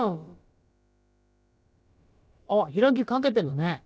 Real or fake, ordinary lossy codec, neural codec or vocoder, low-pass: fake; none; codec, 16 kHz, about 1 kbps, DyCAST, with the encoder's durations; none